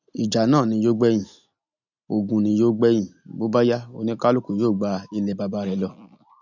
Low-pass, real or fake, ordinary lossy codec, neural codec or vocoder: 7.2 kHz; real; none; none